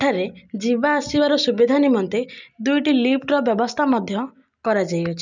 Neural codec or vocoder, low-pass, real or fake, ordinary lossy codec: none; 7.2 kHz; real; none